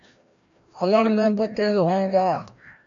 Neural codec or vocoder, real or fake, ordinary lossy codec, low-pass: codec, 16 kHz, 1 kbps, FreqCodec, larger model; fake; MP3, 64 kbps; 7.2 kHz